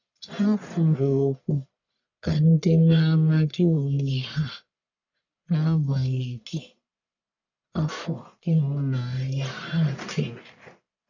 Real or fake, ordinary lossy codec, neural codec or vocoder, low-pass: fake; none; codec, 44.1 kHz, 1.7 kbps, Pupu-Codec; 7.2 kHz